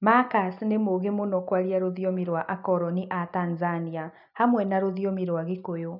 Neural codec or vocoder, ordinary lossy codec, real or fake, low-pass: none; none; real; 5.4 kHz